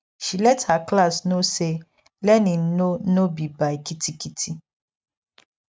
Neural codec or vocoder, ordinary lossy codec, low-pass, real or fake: none; none; none; real